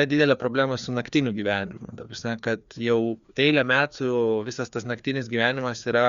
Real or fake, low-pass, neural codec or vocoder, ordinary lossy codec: fake; 7.2 kHz; codec, 16 kHz, 2 kbps, FreqCodec, larger model; Opus, 64 kbps